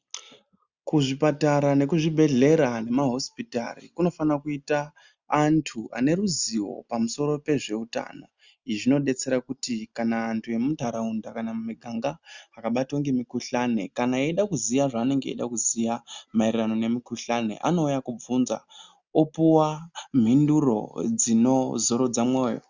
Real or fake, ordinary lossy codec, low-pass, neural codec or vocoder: real; Opus, 64 kbps; 7.2 kHz; none